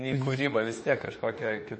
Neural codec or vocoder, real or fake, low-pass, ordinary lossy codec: autoencoder, 48 kHz, 32 numbers a frame, DAC-VAE, trained on Japanese speech; fake; 9.9 kHz; MP3, 32 kbps